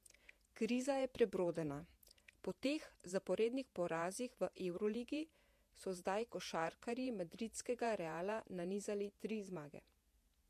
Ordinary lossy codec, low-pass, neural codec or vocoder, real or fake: MP3, 64 kbps; 14.4 kHz; vocoder, 48 kHz, 128 mel bands, Vocos; fake